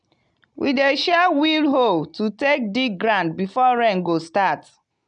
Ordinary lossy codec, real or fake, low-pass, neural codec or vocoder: none; real; 9.9 kHz; none